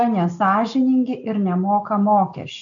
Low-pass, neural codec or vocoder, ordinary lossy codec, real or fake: 7.2 kHz; none; MP3, 96 kbps; real